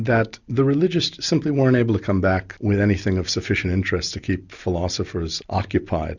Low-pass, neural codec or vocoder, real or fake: 7.2 kHz; none; real